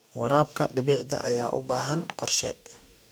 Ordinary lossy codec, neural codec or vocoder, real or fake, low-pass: none; codec, 44.1 kHz, 2.6 kbps, DAC; fake; none